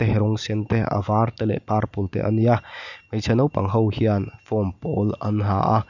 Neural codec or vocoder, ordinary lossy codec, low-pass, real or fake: none; none; 7.2 kHz; real